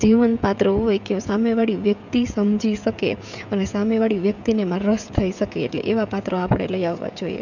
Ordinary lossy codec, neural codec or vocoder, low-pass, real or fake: none; codec, 16 kHz, 16 kbps, FreqCodec, smaller model; 7.2 kHz; fake